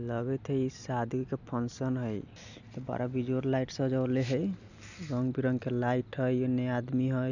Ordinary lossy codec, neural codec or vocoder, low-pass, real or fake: none; none; 7.2 kHz; real